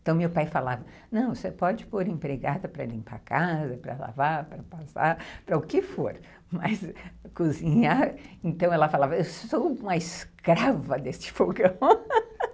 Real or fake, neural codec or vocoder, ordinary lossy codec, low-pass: real; none; none; none